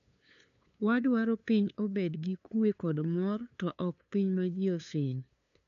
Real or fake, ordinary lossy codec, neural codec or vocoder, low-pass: fake; MP3, 96 kbps; codec, 16 kHz, 2 kbps, FunCodec, trained on LibriTTS, 25 frames a second; 7.2 kHz